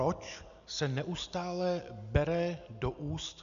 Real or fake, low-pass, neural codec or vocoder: real; 7.2 kHz; none